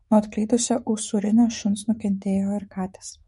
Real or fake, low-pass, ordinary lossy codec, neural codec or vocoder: fake; 19.8 kHz; MP3, 48 kbps; autoencoder, 48 kHz, 128 numbers a frame, DAC-VAE, trained on Japanese speech